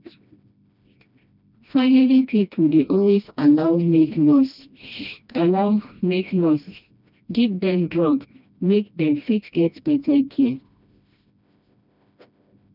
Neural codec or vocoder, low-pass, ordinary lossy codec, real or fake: codec, 16 kHz, 1 kbps, FreqCodec, smaller model; 5.4 kHz; none; fake